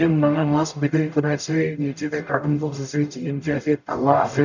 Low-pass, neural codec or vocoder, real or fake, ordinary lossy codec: 7.2 kHz; codec, 44.1 kHz, 0.9 kbps, DAC; fake; none